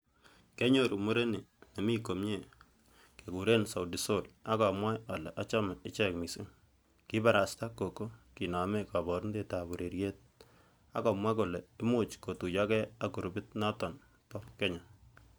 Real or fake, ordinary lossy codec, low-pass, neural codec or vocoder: real; none; none; none